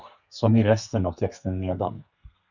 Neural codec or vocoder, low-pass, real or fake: codec, 32 kHz, 1.9 kbps, SNAC; 7.2 kHz; fake